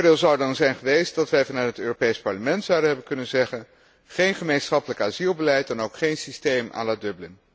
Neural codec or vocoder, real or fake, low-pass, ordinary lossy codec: none; real; none; none